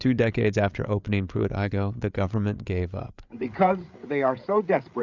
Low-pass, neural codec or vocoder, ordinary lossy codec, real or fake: 7.2 kHz; codec, 16 kHz, 8 kbps, FreqCodec, larger model; Opus, 64 kbps; fake